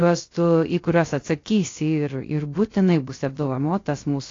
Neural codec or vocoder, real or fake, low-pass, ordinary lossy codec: codec, 16 kHz, 0.3 kbps, FocalCodec; fake; 7.2 kHz; AAC, 32 kbps